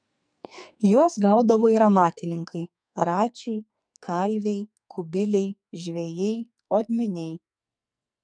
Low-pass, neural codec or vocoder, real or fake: 9.9 kHz; codec, 44.1 kHz, 2.6 kbps, SNAC; fake